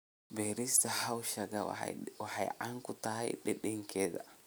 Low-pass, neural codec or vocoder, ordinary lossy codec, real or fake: none; none; none; real